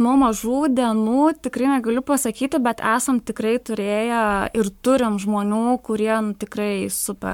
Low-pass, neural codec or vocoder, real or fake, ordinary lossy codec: 19.8 kHz; codec, 44.1 kHz, 7.8 kbps, Pupu-Codec; fake; MP3, 96 kbps